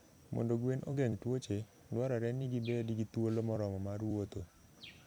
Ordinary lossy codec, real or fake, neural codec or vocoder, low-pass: none; fake; vocoder, 44.1 kHz, 128 mel bands every 256 samples, BigVGAN v2; none